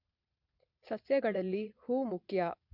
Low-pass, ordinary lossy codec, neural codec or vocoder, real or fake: 5.4 kHz; MP3, 48 kbps; vocoder, 22.05 kHz, 80 mel bands, Vocos; fake